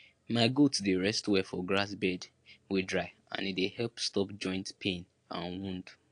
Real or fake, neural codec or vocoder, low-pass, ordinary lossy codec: real; none; 9.9 kHz; AAC, 48 kbps